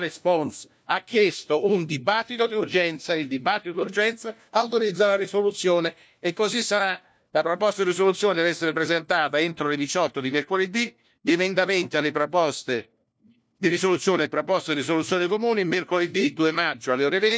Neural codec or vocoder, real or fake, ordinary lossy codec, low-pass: codec, 16 kHz, 1 kbps, FunCodec, trained on LibriTTS, 50 frames a second; fake; none; none